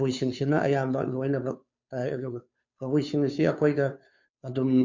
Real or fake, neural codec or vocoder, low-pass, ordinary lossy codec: fake; codec, 16 kHz, 2 kbps, FunCodec, trained on Chinese and English, 25 frames a second; 7.2 kHz; MP3, 48 kbps